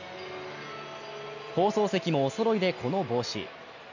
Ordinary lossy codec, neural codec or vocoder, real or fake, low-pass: none; none; real; 7.2 kHz